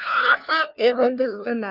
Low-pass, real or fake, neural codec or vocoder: 5.4 kHz; fake; codec, 16 kHz, 1 kbps, FunCodec, trained on LibriTTS, 50 frames a second